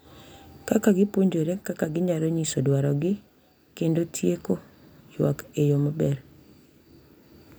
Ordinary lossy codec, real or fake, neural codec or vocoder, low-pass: none; real; none; none